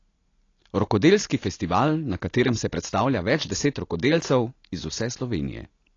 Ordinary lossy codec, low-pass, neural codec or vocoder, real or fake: AAC, 32 kbps; 7.2 kHz; none; real